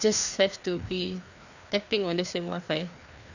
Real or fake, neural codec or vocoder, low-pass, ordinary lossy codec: fake; codec, 16 kHz, 2 kbps, FreqCodec, larger model; 7.2 kHz; none